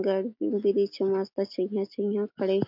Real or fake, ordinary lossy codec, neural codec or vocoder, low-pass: real; MP3, 48 kbps; none; 5.4 kHz